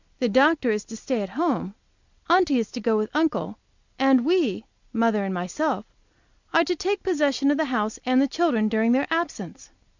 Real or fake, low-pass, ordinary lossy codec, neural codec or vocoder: real; 7.2 kHz; Opus, 64 kbps; none